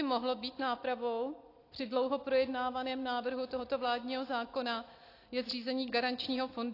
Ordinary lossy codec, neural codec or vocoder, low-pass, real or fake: AAC, 32 kbps; none; 5.4 kHz; real